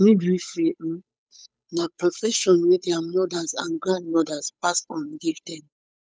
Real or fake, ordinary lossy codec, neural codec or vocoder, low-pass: fake; none; codec, 16 kHz, 8 kbps, FunCodec, trained on Chinese and English, 25 frames a second; none